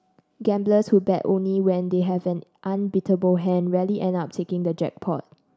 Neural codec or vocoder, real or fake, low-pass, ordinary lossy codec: none; real; none; none